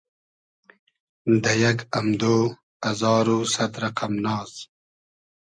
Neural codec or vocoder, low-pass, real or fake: none; 9.9 kHz; real